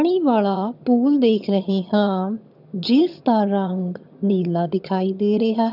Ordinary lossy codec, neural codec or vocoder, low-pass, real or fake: none; vocoder, 22.05 kHz, 80 mel bands, HiFi-GAN; 5.4 kHz; fake